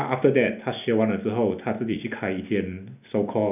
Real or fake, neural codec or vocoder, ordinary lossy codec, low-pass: real; none; none; 3.6 kHz